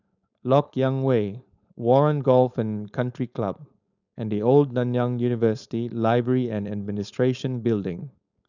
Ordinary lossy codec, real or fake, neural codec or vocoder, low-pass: none; fake; codec, 16 kHz, 4.8 kbps, FACodec; 7.2 kHz